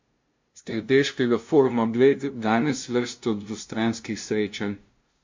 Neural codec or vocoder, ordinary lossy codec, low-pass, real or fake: codec, 16 kHz, 0.5 kbps, FunCodec, trained on LibriTTS, 25 frames a second; MP3, 48 kbps; 7.2 kHz; fake